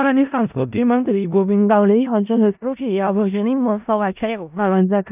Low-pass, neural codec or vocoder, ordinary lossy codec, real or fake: 3.6 kHz; codec, 16 kHz in and 24 kHz out, 0.4 kbps, LongCat-Audio-Codec, four codebook decoder; none; fake